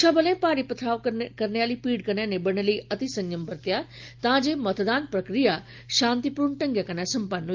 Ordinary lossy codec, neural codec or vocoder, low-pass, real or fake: Opus, 32 kbps; none; 7.2 kHz; real